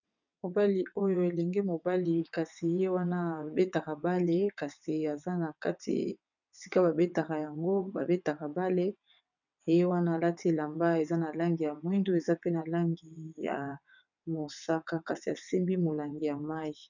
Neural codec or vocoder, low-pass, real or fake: vocoder, 22.05 kHz, 80 mel bands, WaveNeXt; 7.2 kHz; fake